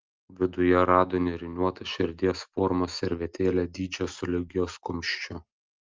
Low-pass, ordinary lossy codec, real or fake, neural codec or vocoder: 7.2 kHz; Opus, 24 kbps; real; none